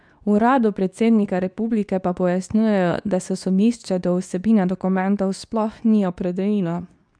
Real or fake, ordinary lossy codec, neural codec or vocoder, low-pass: fake; none; codec, 24 kHz, 0.9 kbps, WavTokenizer, medium speech release version 2; 9.9 kHz